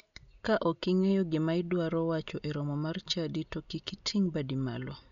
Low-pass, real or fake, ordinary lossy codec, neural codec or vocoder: 7.2 kHz; real; MP3, 96 kbps; none